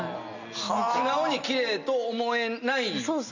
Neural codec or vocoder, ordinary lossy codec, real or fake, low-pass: none; none; real; 7.2 kHz